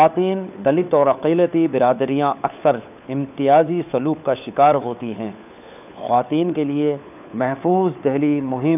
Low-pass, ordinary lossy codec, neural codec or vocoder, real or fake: 3.6 kHz; none; codec, 16 kHz, 4 kbps, FunCodec, trained on LibriTTS, 50 frames a second; fake